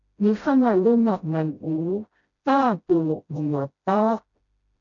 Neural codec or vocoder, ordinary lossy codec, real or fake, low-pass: codec, 16 kHz, 0.5 kbps, FreqCodec, smaller model; MP3, 96 kbps; fake; 7.2 kHz